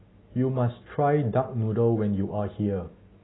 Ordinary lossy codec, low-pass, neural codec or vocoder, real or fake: AAC, 16 kbps; 7.2 kHz; none; real